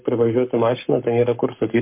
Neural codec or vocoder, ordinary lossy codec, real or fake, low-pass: codec, 44.1 kHz, 7.8 kbps, Pupu-Codec; MP3, 32 kbps; fake; 3.6 kHz